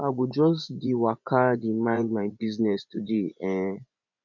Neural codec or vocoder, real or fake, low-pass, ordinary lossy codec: vocoder, 24 kHz, 100 mel bands, Vocos; fake; 7.2 kHz; none